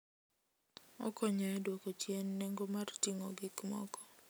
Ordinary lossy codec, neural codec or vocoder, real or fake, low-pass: none; none; real; none